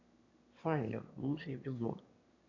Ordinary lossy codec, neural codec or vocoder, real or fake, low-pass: AAC, 48 kbps; autoencoder, 22.05 kHz, a latent of 192 numbers a frame, VITS, trained on one speaker; fake; 7.2 kHz